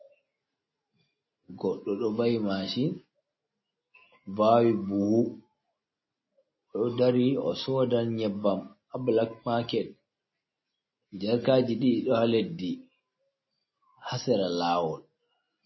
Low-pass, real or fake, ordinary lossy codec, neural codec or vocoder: 7.2 kHz; real; MP3, 24 kbps; none